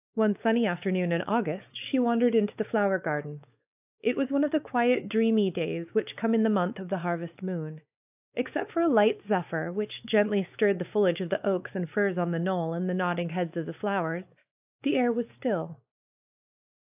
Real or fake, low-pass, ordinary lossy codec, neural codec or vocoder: fake; 3.6 kHz; AAC, 32 kbps; codec, 16 kHz, 4 kbps, X-Codec, WavLM features, trained on Multilingual LibriSpeech